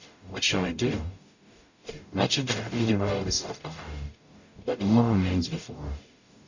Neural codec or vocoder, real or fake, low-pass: codec, 44.1 kHz, 0.9 kbps, DAC; fake; 7.2 kHz